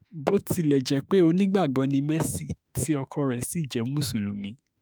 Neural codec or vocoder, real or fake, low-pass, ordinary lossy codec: autoencoder, 48 kHz, 32 numbers a frame, DAC-VAE, trained on Japanese speech; fake; none; none